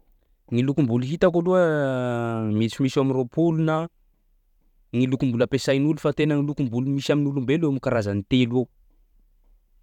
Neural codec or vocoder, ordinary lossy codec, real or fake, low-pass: vocoder, 44.1 kHz, 128 mel bands every 512 samples, BigVGAN v2; none; fake; 19.8 kHz